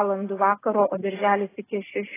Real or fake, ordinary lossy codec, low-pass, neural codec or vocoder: real; AAC, 16 kbps; 3.6 kHz; none